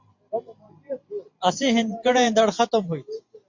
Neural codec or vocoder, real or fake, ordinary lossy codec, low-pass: vocoder, 44.1 kHz, 128 mel bands every 512 samples, BigVGAN v2; fake; MP3, 48 kbps; 7.2 kHz